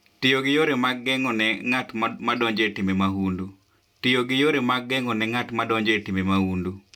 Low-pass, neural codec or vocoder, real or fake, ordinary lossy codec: 19.8 kHz; none; real; none